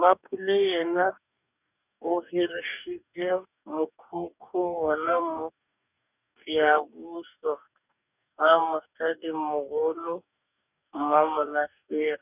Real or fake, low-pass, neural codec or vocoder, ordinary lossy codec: fake; 3.6 kHz; codec, 44.1 kHz, 2.6 kbps, DAC; none